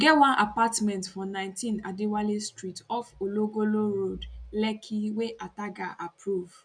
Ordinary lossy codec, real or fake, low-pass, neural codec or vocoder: none; real; 9.9 kHz; none